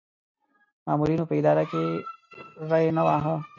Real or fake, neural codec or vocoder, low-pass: real; none; 7.2 kHz